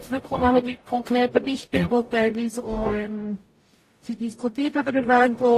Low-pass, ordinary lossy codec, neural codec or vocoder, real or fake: 14.4 kHz; AAC, 48 kbps; codec, 44.1 kHz, 0.9 kbps, DAC; fake